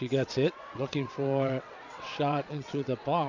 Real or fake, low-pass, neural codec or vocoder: fake; 7.2 kHz; vocoder, 22.05 kHz, 80 mel bands, WaveNeXt